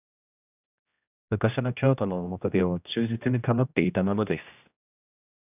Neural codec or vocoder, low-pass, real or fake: codec, 16 kHz, 1 kbps, X-Codec, HuBERT features, trained on general audio; 3.6 kHz; fake